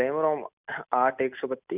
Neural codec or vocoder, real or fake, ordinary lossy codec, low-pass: none; real; none; 3.6 kHz